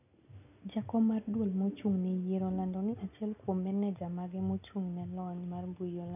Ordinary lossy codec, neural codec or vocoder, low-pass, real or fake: none; none; 3.6 kHz; real